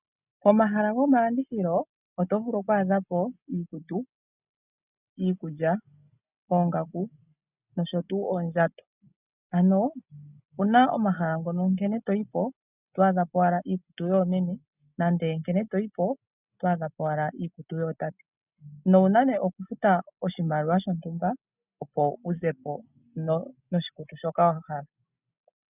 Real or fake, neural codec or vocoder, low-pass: real; none; 3.6 kHz